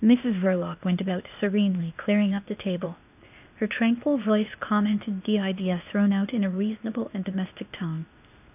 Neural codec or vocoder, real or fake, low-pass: codec, 16 kHz, 0.8 kbps, ZipCodec; fake; 3.6 kHz